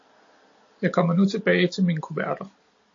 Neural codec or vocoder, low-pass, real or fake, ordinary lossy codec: none; 7.2 kHz; real; AAC, 48 kbps